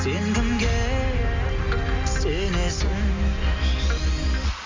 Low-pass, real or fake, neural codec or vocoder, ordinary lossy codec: 7.2 kHz; real; none; MP3, 64 kbps